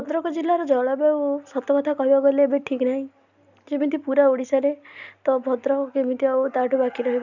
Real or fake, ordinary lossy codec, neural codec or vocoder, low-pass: real; none; none; 7.2 kHz